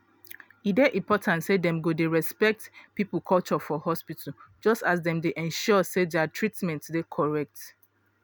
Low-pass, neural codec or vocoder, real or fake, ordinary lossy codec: none; none; real; none